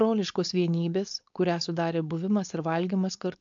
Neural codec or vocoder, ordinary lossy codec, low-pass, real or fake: codec, 16 kHz, 4.8 kbps, FACodec; AAC, 64 kbps; 7.2 kHz; fake